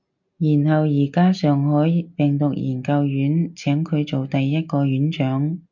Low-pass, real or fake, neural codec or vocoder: 7.2 kHz; real; none